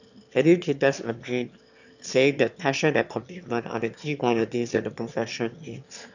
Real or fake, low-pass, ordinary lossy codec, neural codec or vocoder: fake; 7.2 kHz; none; autoencoder, 22.05 kHz, a latent of 192 numbers a frame, VITS, trained on one speaker